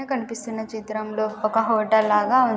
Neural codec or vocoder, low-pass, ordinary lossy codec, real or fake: none; none; none; real